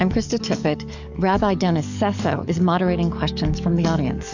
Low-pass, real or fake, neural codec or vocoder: 7.2 kHz; fake; codec, 44.1 kHz, 7.8 kbps, DAC